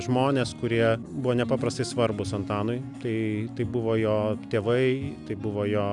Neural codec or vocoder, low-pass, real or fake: none; 10.8 kHz; real